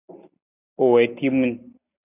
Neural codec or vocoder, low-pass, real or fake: none; 3.6 kHz; real